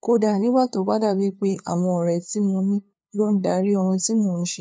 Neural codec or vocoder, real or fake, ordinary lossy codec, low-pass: codec, 16 kHz, 2 kbps, FunCodec, trained on LibriTTS, 25 frames a second; fake; none; none